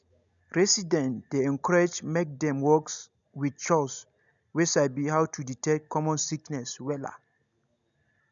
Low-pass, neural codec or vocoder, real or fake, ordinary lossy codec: 7.2 kHz; none; real; none